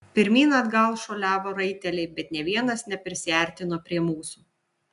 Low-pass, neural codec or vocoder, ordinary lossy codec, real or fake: 10.8 kHz; none; AAC, 96 kbps; real